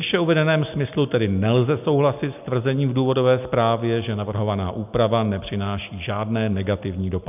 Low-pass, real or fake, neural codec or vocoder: 3.6 kHz; real; none